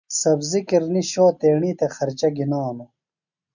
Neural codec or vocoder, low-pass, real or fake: none; 7.2 kHz; real